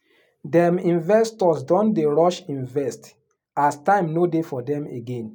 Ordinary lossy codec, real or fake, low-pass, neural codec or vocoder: none; real; 19.8 kHz; none